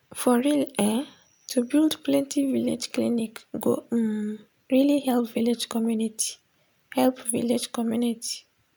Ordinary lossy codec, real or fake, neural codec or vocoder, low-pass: none; real; none; none